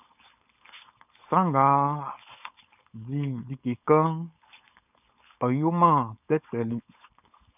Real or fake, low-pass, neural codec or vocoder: fake; 3.6 kHz; codec, 16 kHz, 4.8 kbps, FACodec